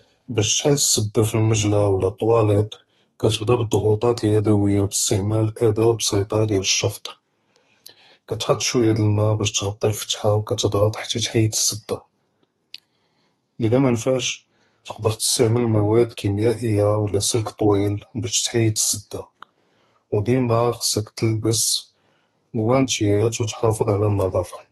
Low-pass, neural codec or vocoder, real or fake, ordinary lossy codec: 14.4 kHz; codec, 32 kHz, 1.9 kbps, SNAC; fake; AAC, 32 kbps